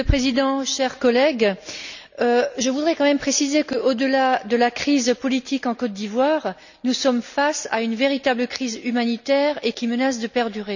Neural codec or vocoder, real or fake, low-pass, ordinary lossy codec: none; real; 7.2 kHz; none